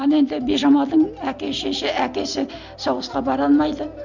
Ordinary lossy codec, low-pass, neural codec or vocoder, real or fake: none; 7.2 kHz; none; real